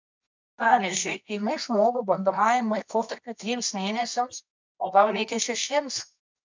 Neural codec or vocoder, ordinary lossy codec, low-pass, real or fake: codec, 24 kHz, 0.9 kbps, WavTokenizer, medium music audio release; MP3, 64 kbps; 7.2 kHz; fake